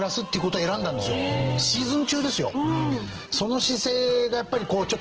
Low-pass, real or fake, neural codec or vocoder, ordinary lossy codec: 7.2 kHz; real; none; Opus, 16 kbps